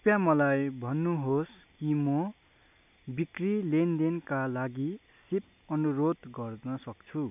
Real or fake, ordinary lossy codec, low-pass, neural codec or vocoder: real; none; 3.6 kHz; none